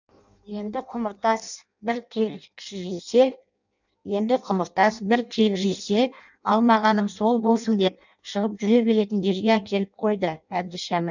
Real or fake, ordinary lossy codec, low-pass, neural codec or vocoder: fake; none; 7.2 kHz; codec, 16 kHz in and 24 kHz out, 0.6 kbps, FireRedTTS-2 codec